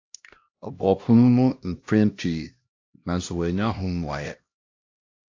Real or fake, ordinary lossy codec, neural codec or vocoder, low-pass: fake; AAC, 48 kbps; codec, 16 kHz, 1 kbps, X-Codec, HuBERT features, trained on LibriSpeech; 7.2 kHz